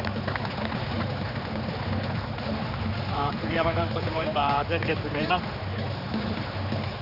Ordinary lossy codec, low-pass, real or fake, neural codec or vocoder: none; 5.4 kHz; fake; codec, 16 kHz, 4 kbps, X-Codec, HuBERT features, trained on general audio